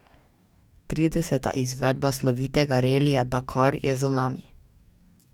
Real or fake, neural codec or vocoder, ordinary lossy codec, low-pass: fake; codec, 44.1 kHz, 2.6 kbps, DAC; none; 19.8 kHz